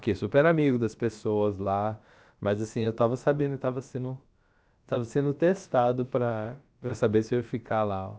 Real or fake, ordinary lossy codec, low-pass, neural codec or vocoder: fake; none; none; codec, 16 kHz, about 1 kbps, DyCAST, with the encoder's durations